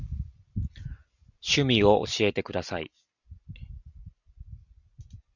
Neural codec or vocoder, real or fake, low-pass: none; real; 7.2 kHz